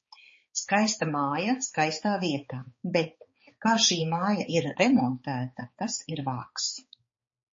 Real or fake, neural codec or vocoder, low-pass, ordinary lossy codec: fake; codec, 16 kHz, 4 kbps, X-Codec, HuBERT features, trained on balanced general audio; 7.2 kHz; MP3, 32 kbps